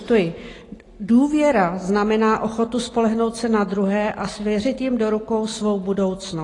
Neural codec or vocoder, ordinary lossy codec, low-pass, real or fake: none; AAC, 32 kbps; 10.8 kHz; real